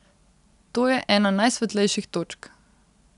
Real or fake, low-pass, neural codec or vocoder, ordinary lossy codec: real; 10.8 kHz; none; none